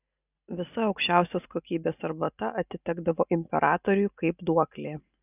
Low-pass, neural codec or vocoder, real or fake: 3.6 kHz; none; real